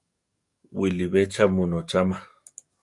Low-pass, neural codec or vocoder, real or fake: 10.8 kHz; codec, 44.1 kHz, 7.8 kbps, DAC; fake